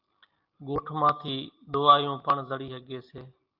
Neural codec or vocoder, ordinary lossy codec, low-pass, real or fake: none; Opus, 32 kbps; 5.4 kHz; real